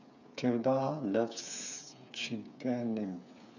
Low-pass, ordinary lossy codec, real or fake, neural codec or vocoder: 7.2 kHz; none; fake; codec, 16 kHz, 8 kbps, FreqCodec, smaller model